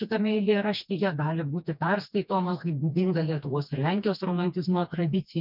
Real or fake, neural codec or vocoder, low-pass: fake; codec, 16 kHz, 2 kbps, FreqCodec, smaller model; 5.4 kHz